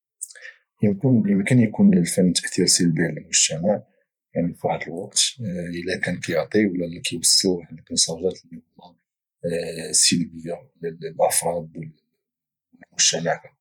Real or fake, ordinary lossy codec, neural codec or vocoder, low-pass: fake; none; vocoder, 44.1 kHz, 128 mel bands every 512 samples, BigVGAN v2; 19.8 kHz